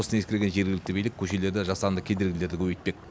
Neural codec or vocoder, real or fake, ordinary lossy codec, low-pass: none; real; none; none